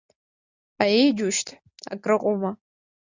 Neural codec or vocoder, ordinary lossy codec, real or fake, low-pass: none; Opus, 64 kbps; real; 7.2 kHz